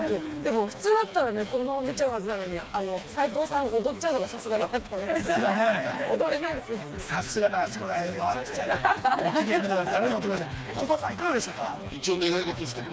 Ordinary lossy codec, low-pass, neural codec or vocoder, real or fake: none; none; codec, 16 kHz, 2 kbps, FreqCodec, smaller model; fake